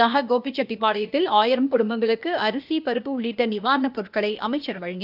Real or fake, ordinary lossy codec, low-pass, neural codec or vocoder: fake; none; 5.4 kHz; codec, 16 kHz, 0.8 kbps, ZipCodec